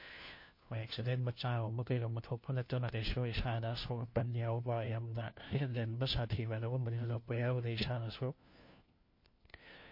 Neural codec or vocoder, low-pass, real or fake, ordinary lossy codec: codec, 16 kHz, 1 kbps, FunCodec, trained on LibriTTS, 50 frames a second; 5.4 kHz; fake; MP3, 32 kbps